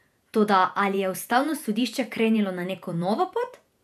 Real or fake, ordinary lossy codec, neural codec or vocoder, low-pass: fake; none; vocoder, 48 kHz, 128 mel bands, Vocos; 14.4 kHz